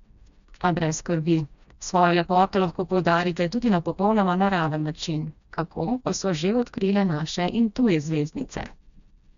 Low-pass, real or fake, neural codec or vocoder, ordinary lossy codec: 7.2 kHz; fake; codec, 16 kHz, 1 kbps, FreqCodec, smaller model; none